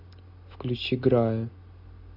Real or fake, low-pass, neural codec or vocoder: real; 5.4 kHz; none